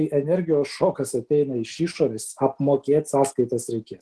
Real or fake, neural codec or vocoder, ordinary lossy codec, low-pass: real; none; Opus, 16 kbps; 10.8 kHz